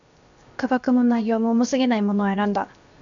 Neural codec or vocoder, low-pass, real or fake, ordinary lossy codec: codec, 16 kHz, 0.7 kbps, FocalCodec; 7.2 kHz; fake; AAC, 64 kbps